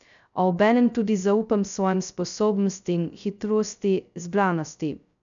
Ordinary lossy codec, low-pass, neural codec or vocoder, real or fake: none; 7.2 kHz; codec, 16 kHz, 0.2 kbps, FocalCodec; fake